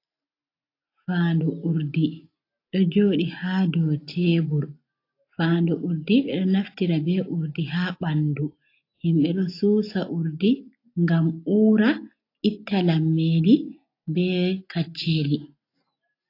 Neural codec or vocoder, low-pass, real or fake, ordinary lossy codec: none; 5.4 kHz; real; AAC, 32 kbps